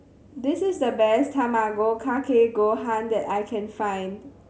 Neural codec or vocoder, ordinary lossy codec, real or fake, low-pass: none; none; real; none